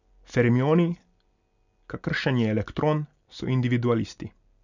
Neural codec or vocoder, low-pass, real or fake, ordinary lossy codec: none; 7.2 kHz; real; none